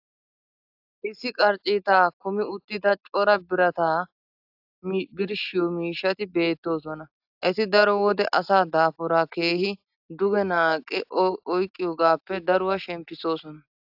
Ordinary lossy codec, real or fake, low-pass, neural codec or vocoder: AAC, 48 kbps; real; 5.4 kHz; none